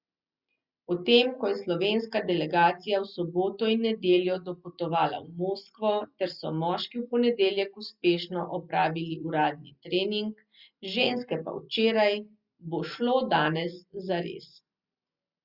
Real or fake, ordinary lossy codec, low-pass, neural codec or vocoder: real; Opus, 64 kbps; 5.4 kHz; none